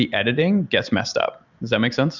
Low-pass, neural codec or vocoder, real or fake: 7.2 kHz; none; real